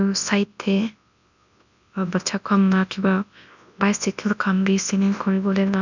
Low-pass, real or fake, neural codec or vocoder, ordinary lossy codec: 7.2 kHz; fake; codec, 24 kHz, 0.9 kbps, WavTokenizer, large speech release; none